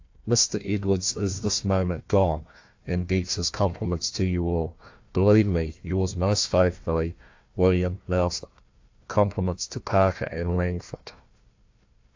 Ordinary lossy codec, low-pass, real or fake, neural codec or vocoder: AAC, 48 kbps; 7.2 kHz; fake; codec, 16 kHz, 1 kbps, FunCodec, trained on Chinese and English, 50 frames a second